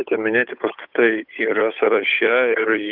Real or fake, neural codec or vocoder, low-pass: fake; codec, 16 kHz, 8 kbps, FunCodec, trained on Chinese and English, 25 frames a second; 5.4 kHz